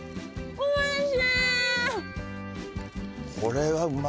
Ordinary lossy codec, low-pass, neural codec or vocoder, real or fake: none; none; none; real